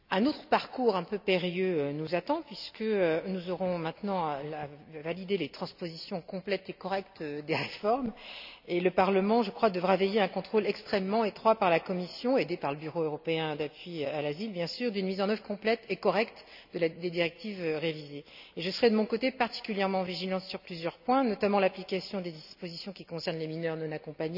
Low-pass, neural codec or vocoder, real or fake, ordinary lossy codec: 5.4 kHz; none; real; none